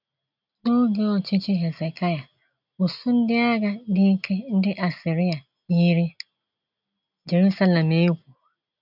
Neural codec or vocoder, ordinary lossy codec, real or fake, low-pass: none; none; real; 5.4 kHz